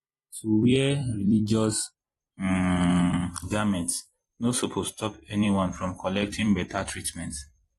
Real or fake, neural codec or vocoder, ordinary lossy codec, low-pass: fake; vocoder, 44.1 kHz, 128 mel bands every 256 samples, BigVGAN v2; AAC, 32 kbps; 19.8 kHz